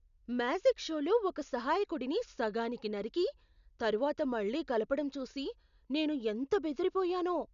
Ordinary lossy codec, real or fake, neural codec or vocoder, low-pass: none; real; none; 7.2 kHz